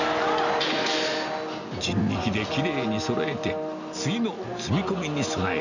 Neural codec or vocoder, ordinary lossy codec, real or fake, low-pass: none; none; real; 7.2 kHz